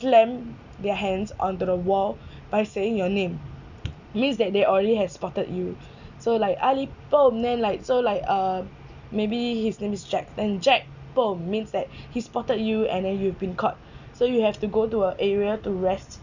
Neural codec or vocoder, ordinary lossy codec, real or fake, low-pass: none; none; real; 7.2 kHz